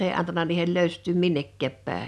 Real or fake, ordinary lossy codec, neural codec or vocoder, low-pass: real; none; none; none